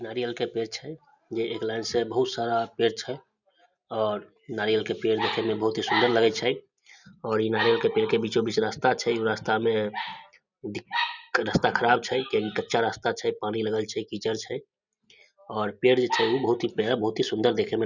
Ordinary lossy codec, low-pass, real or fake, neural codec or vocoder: none; 7.2 kHz; real; none